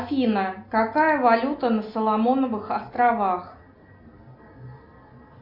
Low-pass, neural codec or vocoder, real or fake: 5.4 kHz; none; real